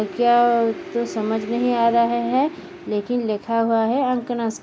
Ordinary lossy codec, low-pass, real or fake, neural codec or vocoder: none; none; real; none